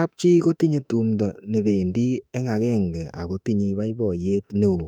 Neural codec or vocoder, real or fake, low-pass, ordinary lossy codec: autoencoder, 48 kHz, 32 numbers a frame, DAC-VAE, trained on Japanese speech; fake; 19.8 kHz; none